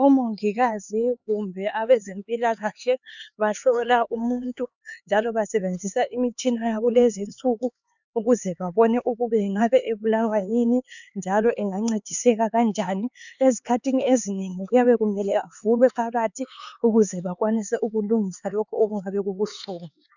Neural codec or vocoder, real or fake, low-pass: codec, 16 kHz, 4 kbps, X-Codec, HuBERT features, trained on LibriSpeech; fake; 7.2 kHz